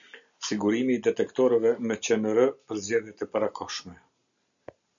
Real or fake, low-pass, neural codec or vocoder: real; 7.2 kHz; none